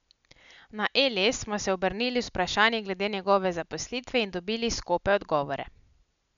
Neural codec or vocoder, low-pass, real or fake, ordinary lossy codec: none; 7.2 kHz; real; none